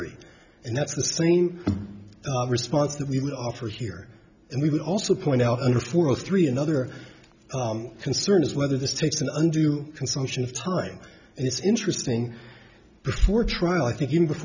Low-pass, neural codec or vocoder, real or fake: 7.2 kHz; none; real